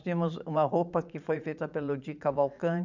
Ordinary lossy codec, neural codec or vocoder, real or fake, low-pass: none; vocoder, 44.1 kHz, 80 mel bands, Vocos; fake; 7.2 kHz